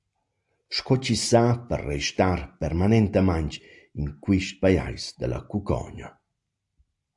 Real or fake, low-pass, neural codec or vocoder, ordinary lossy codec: real; 10.8 kHz; none; AAC, 64 kbps